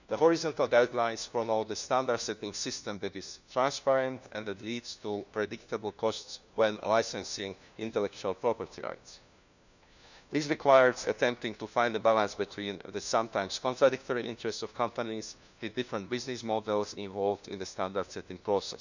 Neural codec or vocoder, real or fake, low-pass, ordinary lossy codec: codec, 16 kHz, 1 kbps, FunCodec, trained on LibriTTS, 50 frames a second; fake; 7.2 kHz; none